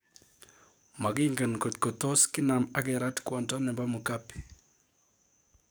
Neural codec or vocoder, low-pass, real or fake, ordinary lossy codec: codec, 44.1 kHz, 7.8 kbps, DAC; none; fake; none